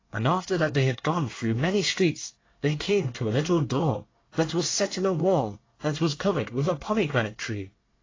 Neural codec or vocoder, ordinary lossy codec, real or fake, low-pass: codec, 24 kHz, 1 kbps, SNAC; AAC, 32 kbps; fake; 7.2 kHz